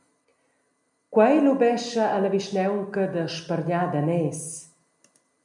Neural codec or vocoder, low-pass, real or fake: none; 10.8 kHz; real